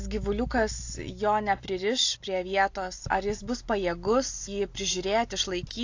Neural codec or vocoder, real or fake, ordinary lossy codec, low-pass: none; real; AAC, 48 kbps; 7.2 kHz